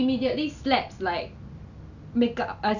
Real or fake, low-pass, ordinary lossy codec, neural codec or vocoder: real; 7.2 kHz; none; none